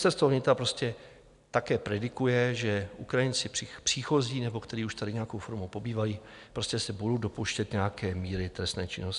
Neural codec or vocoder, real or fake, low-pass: none; real; 10.8 kHz